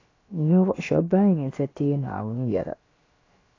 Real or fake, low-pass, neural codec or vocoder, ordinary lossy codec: fake; 7.2 kHz; codec, 16 kHz, about 1 kbps, DyCAST, with the encoder's durations; AAC, 32 kbps